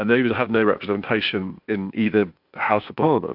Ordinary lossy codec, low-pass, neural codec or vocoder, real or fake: AAC, 48 kbps; 5.4 kHz; codec, 16 kHz, 0.8 kbps, ZipCodec; fake